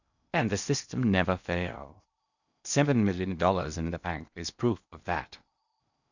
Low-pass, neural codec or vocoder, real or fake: 7.2 kHz; codec, 16 kHz in and 24 kHz out, 0.8 kbps, FocalCodec, streaming, 65536 codes; fake